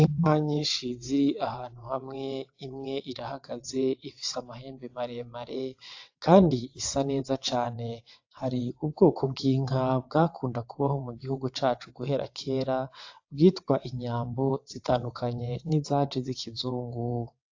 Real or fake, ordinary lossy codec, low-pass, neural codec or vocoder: fake; AAC, 48 kbps; 7.2 kHz; vocoder, 22.05 kHz, 80 mel bands, WaveNeXt